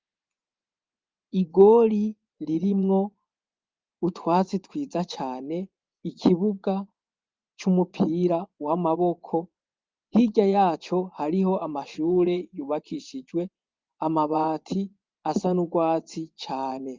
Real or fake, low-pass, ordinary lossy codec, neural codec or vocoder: fake; 7.2 kHz; Opus, 32 kbps; vocoder, 24 kHz, 100 mel bands, Vocos